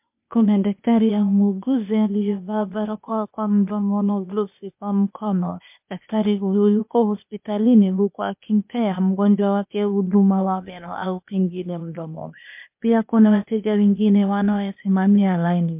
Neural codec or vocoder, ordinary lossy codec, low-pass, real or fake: codec, 16 kHz, 0.8 kbps, ZipCodec; MP3, 32 kbps; 3.6 kHz; fake